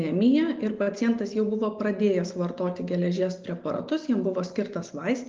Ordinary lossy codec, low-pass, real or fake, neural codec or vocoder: Opus, 32 kbps; 7.2 kHz; real; none